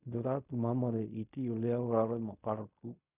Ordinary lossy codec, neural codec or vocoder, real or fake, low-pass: none; codec, 16 kHz in and 24 kHz out, 0.4 kbps, LongCat-Audio-Codec, fine tuned four codebook decoder; fake; 3.6 kHz